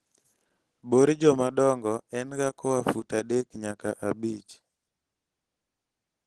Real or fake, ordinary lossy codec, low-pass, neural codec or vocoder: real; Opus, 16 kbps; 10.8 kHz; none